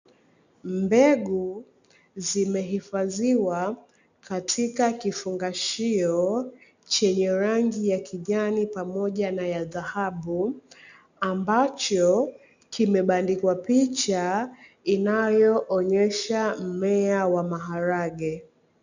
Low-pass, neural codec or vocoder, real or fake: 7.2 kHz; none; real